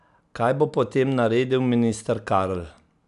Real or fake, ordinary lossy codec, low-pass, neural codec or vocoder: real; none; 10.8 kHz; none